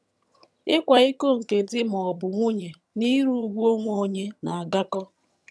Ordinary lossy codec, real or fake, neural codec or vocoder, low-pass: none; fake; vocoder, 22.05 kHz, 80 mel bands, HiFi-GAN; none